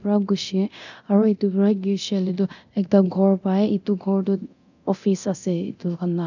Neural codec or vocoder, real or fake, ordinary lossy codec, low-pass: codec, 24 kHz, 0.9 kbps, DualCodec; fake; none; 7.2 kHz